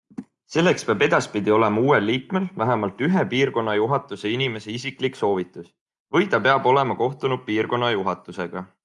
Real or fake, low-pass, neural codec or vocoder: real; 10.8 kHz; none